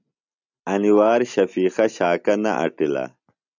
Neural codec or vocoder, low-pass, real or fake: none; 7.2 kHz; real